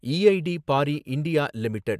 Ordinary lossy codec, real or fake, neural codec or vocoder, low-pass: AAC, 96 kbps; real; none; 14.4 kHz